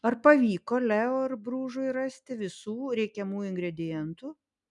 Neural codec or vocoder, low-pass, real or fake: none; 10.8 kHz; real